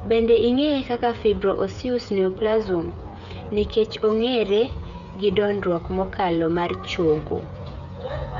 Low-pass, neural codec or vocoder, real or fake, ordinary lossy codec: 7.2 kHz; codec, 16 kHz, 8 kbps, FreqCodec, smaller model; fake; none